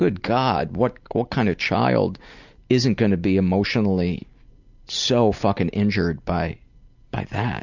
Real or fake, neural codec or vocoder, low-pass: real; none; 7.2 kHz